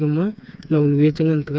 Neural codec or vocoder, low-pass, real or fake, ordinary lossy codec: codec, 16 kHz, 4 kbps, FreqCodec, smaller model; none; fake; none